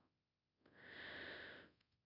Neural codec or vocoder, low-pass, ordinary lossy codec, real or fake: codec, 24 kHz, 0.5 kbps, DualCodec; 5.4 kHz; none; fake